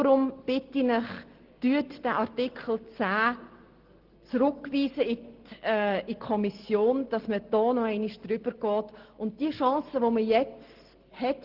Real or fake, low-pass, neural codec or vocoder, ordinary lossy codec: real; 5.4 kHz; none; Opus, 16 kbps